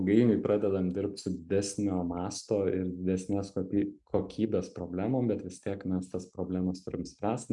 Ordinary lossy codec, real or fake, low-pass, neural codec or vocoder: MP3, 96 kbps; real; 10.8 kHz; none